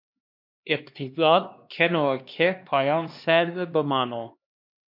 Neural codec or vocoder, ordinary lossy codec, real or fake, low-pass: codec, 16 kHz, 2 kbps, X-Codec, HuBERT features, trained on LibriSpeech; MP3, 48 kbps; fake; 5.4 kHz